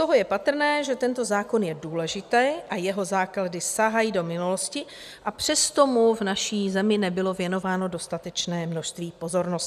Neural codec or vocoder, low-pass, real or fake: none; 14.4 kHz; real